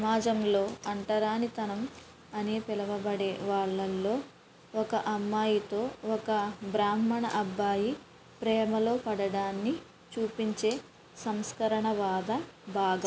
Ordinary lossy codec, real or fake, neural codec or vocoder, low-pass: none; real; none; none